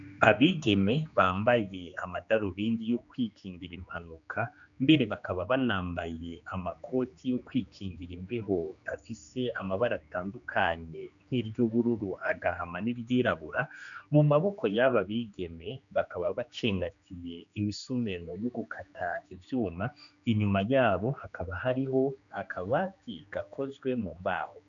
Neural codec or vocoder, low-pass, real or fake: codec, 16 kHz, 2 kbps, X-Codec, HuBERT features, trained on general audio; 7.2 kHz; fake